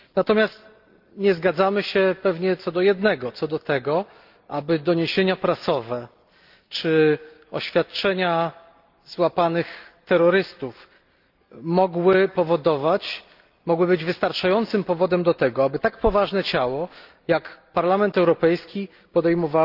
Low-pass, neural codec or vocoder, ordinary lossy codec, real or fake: 5.4 kHz; none; Opus, 32 kbps; real